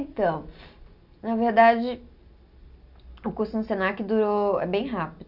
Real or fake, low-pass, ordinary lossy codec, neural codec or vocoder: real; 5.4 kHz; none; none